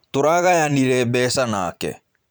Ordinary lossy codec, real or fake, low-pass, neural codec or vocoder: none; fake; none; vocoder, 44.1 kHz, 128 mel bands every 512 samples, BigVGAN v2